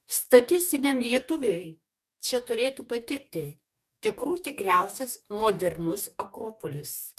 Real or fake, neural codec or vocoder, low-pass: fake; codec, 44.1 kHz, 2.6 kbps, DAC; 14.4 kHz